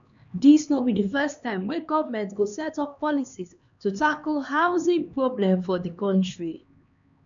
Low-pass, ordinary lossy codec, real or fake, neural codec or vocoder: 7.2 kHz; none; fake; codec, 16 kHz, 2 kbps, X-Codec, HuBERT features, trained on LibriSpeech